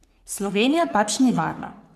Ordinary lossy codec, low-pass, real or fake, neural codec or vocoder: none; 14.4 kHz; fake; codec, 44.1 kHz, 3.4 kbps, Pupu-Codec